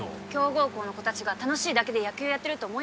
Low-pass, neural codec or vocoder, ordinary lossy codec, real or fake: none; none; none; real